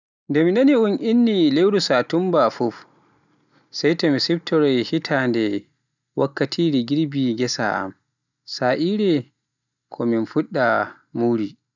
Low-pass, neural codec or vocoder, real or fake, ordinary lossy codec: 7.2 kHz; none; real; none